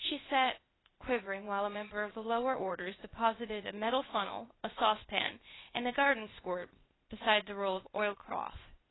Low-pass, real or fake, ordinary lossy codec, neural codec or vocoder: 7.2 kHz; fake; AAC, 16 kbps; codec, 16 kHz, 0.8 kbps, ZipCodec